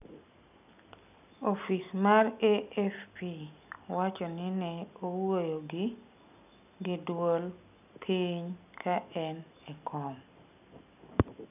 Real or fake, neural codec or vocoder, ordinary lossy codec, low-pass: real; none; none; 3.6 kHz